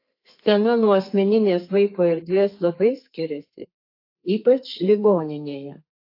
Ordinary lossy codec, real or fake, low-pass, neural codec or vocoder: AAC, 32 kbps; fake; 5.4 kHz; codec, 32 kHz, 1.9 kbps, SNAC